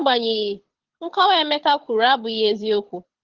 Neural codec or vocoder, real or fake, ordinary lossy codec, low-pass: codec, 24 kHz, 6 kbps, HILCodec; fake; Opus, 16 kbps; 7.2 kHz